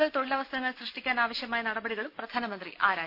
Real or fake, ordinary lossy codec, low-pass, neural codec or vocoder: real; none; 5.4 kHz; none